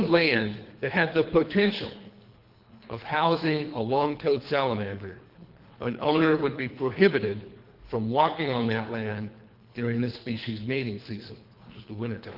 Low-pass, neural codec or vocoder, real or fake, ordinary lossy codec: 5.4 kHz; codec, 24 kHz, 3 kbps, HILCodec; fake; Opus, 24 kbps